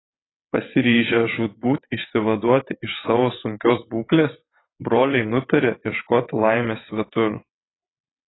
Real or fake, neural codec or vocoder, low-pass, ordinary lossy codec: fake; vocoder, 22.05 kHz, 80 mel bands, WaveNeXt; 7.2 kHz; AAC, 16 kbps